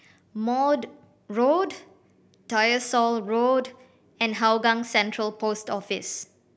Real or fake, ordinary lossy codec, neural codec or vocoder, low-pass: real; none; none; none